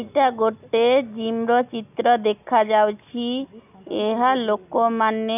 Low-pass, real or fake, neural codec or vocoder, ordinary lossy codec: 3.6 kHz; real; none; none